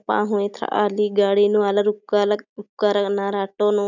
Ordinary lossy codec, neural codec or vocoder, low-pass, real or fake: none; none; 7.2 kHz; real